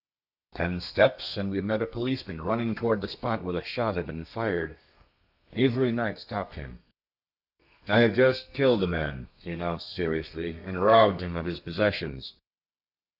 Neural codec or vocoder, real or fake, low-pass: codec, 32 kHz, 1.9 kbps, SNAC; fake; 5.4 kHz